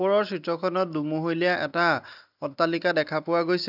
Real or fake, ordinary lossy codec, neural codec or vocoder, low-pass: real; none; none; 5.4 kHz